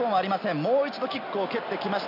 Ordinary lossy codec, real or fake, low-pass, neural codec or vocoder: none; real; 5.4 kHz; none